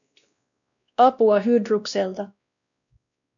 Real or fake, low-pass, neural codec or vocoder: fake; 7.2 kHz; codec, 16 kHz, 1 kbps, X-Codec, WavLM features, trained on Multilingual LibriSpeech